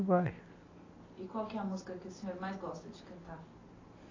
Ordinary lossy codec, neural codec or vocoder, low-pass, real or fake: AAC, 32 kbps; none; 7.2 kHz; real